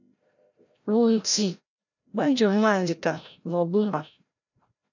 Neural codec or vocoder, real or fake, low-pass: codec, 16 kHz, 0.5 kbps, FreqCodec, larger model; fake; 7.2 kHz